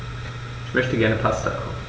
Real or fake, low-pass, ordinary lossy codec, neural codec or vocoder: real; none; none; none